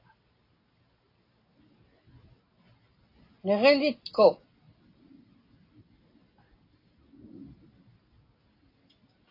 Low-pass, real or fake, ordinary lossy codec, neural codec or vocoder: 5.4 kHz; real; AAC, 32 kbps; none